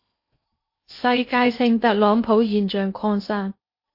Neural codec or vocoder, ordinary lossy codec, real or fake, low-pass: codec, 16 kHz in and 24 kHz out, 0.8 kbps, FocalCodec, streaming, 65536 codes; MP3, 32 kbps; fake; 5.4 kHz